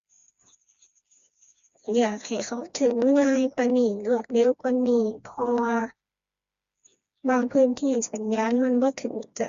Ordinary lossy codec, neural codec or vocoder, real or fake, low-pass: Opus, 64 kbps; codec, 16 kHz, 2 kbps, FreqCodec, smaller model; fake; 7.2 kHz